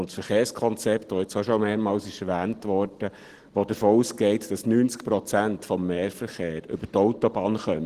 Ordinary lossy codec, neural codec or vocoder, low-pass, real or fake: Opus, 16 kbps; none; 14.4 kHz; real